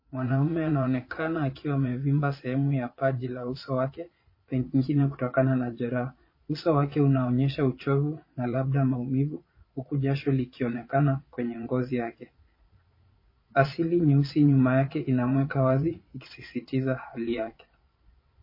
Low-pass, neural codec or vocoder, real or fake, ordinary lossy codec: 5.4 kHz; vocoder, 22.05 kHz, 80 mel bands, Vocos; fake; MP3, 24 kbps